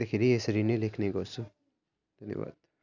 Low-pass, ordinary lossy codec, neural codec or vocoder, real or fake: 7.2 kHz; none; none; real